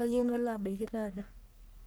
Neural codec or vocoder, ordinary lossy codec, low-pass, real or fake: codec, 44.1 kHz, 1.7 kbps, Pupu-Codec; none; none; fake